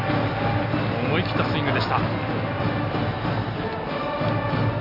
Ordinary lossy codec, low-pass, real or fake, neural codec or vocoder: none; 5.4 kHz; real; none